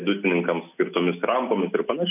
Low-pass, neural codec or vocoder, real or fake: 3.6 kHz; none; real